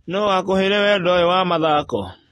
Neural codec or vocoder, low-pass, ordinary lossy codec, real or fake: none; 19.8 kHz; AAC, 32 kbps; real